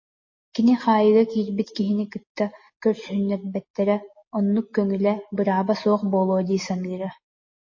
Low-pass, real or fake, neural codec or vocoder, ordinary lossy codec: 7.2 kHz; real; none; MP3, 32 kbps